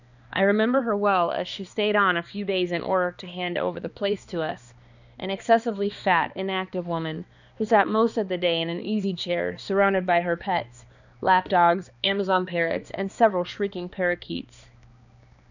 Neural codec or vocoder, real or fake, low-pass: codec, 16 kHz, 2 kbps, X-Codec, HuBERT features, trained on balanced general audio; fake; 7.2 kHz